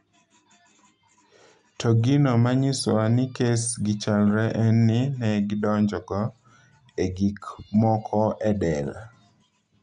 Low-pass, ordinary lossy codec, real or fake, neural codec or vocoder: 10.8 kHz; none; real; none